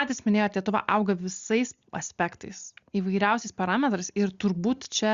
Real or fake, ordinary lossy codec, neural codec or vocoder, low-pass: real; Opus, 64 kbps; none; 7.2 kHz